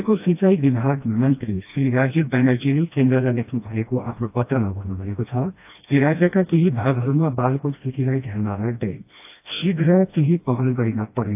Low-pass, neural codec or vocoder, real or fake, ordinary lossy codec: 3.6 kHz; codec, 16 kHz, 1 kbps, FreqCodec, smaller model; fake; AAC, 32 kbps